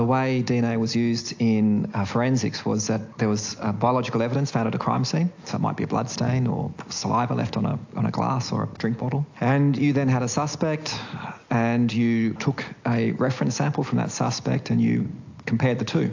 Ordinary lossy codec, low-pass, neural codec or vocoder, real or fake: AAC, 48 kbps; 7.2 kHz; none; real